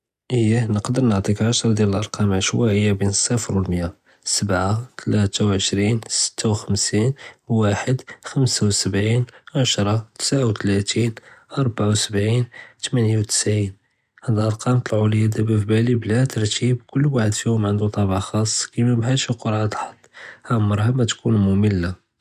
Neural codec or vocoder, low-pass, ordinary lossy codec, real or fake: none; 14.4 kHz; none; real